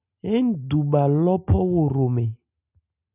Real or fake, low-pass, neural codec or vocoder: real; 3.6 kHz; none